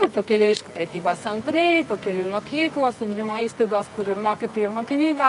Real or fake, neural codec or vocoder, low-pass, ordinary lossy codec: fake; codec, 24 kHz, 0.9 kbps, WavTokenizer, medium music audio release; 10.8 kHz; AAC, 96 kbps